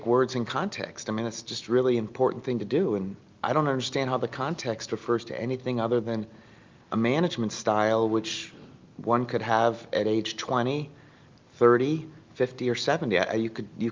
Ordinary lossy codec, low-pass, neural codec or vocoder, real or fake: Opus, 24 kbps; 7.2 kHz; none; real